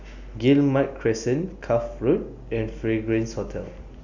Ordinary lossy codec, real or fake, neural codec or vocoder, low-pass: none; real; none; 7.2 kHz